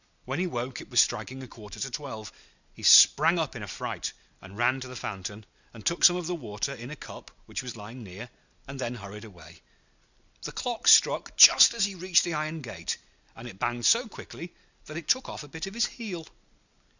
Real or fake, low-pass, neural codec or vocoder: real; 7.2 kHz; none